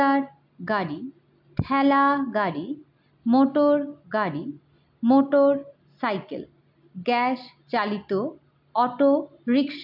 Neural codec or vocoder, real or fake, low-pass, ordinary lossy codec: none; real; 5.4 kHz; AAC, 48 kbps